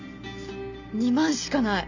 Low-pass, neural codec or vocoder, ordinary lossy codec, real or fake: 7.2 kHz; none; none; real